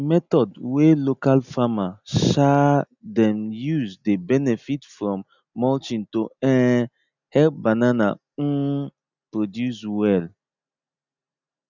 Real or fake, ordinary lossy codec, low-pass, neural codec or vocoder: real; none; 7.2 kHz; none